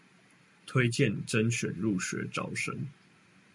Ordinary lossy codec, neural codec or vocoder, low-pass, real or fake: MP3, 96 kbps; none; 10.8 kHz; real